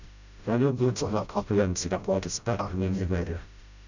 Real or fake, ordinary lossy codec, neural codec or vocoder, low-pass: fake; none; codec, 16 kHz, 0.5 kbps, FreqCodec, smaller model; 7.2 kHz